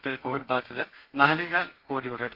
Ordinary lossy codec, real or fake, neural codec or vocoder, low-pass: Opus, 64 kbps; fake; codec, 32 kHz, 1.9 kbps, SNAC; 5.4 kHz